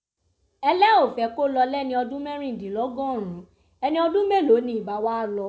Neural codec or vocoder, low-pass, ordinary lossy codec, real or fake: none; none; none; real